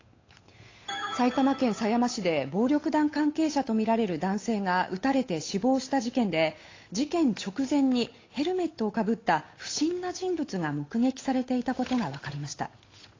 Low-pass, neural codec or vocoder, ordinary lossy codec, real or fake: 7.2 kHz; codec, 16 kHz, 8 kbps, FunCodec, trained on Chinese and English, 25 frames a second; AAC, 32 kbps; fake